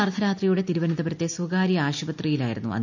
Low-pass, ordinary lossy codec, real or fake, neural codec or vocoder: 7.2 kHz; none; real; none